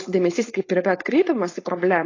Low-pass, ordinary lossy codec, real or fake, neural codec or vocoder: 7.2 kHz; AAC, 32 kbps; fake; codec, 16 kHz, 4.8 kbps, FACodec